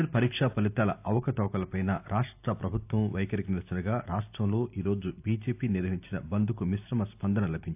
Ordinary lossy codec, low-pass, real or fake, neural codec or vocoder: AAC, 32 kbps; 3.6 kHz; real; none